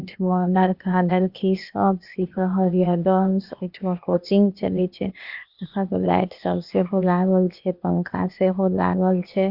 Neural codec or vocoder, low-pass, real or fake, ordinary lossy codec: codec, 16 kHz, 0.8 kbps, ZipCodec; 5.4 kHz; fake; none